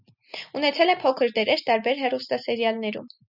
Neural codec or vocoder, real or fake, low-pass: none; real; 5.4 kHz